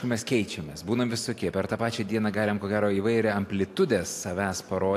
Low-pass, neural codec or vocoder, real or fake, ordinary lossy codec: 14.4 kHz; none; real; AAC, 64 kbps